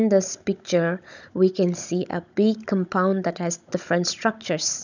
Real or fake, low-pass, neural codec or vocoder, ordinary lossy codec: fake; 7.2 kHz; codec, 16 kHz, 16 kbps, FunCodec, trained on Chinese and English, 50 frames a second; none